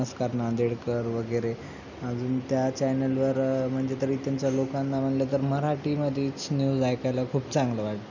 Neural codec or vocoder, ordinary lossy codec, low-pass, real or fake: none; none; 7.2 kHz; real